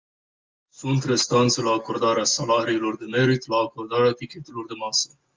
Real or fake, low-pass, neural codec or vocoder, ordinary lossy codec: real; 7.2 kHz; none; Opus, 32 kbps